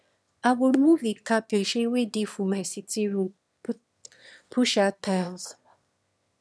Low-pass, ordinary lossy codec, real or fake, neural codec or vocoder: none; none; fake; autoencoder, 22.05 kHz, a latent of 192 numbers a frame, VITS, trained on one speaker